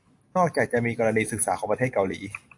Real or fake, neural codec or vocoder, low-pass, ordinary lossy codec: real; none; 10.8 kHz; AAC, 64 kbps